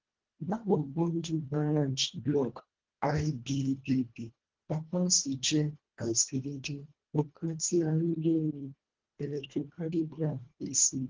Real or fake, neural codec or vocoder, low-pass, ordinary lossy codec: fake; codec, 24 kHz, 1.5 kbps, HILCodec; 7.2 kHz; Opus, 16 kbps